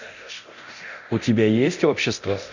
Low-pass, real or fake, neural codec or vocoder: 7.2 kHz; fake; codec, 24 kHz, 0.9 kbps, DualCodec